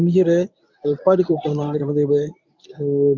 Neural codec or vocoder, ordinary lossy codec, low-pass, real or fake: codec, 24 kHz, 0.9 kbps, WavTokenizer, medium speech release version 1; none; 7.2 kHz; fake